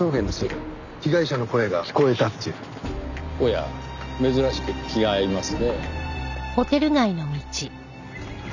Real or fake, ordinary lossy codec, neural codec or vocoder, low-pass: real; none; none; 7.2 kHz